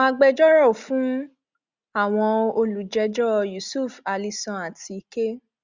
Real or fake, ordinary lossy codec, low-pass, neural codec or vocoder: real; Opus, 64 kbps; 7.2 kHz; none